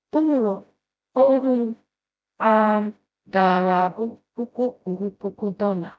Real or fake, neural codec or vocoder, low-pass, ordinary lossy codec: fake; codec, 16 kHz, 0.5 kbps, FreqCodec, smaller model; none; none